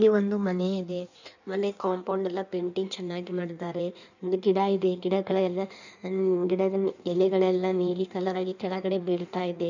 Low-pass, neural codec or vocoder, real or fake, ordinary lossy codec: 7.2 kHz; codec, 16 kHz in and 24 kHz out, 1.1 kbps, FireRedTTS-2 codec; fake; none